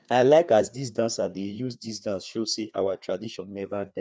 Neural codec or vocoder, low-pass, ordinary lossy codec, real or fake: codec, 16 kHz, 2 kbps, FreqCodec, larger model; none; none; fake